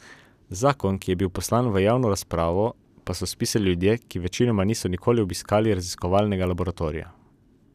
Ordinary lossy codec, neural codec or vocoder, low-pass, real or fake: none; none; 14.4 kHz; real